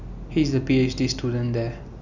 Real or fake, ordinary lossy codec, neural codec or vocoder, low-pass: real; none; none; 7.2 kHz